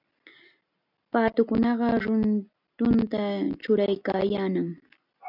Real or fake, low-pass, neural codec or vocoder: real; 5.4 kHz; none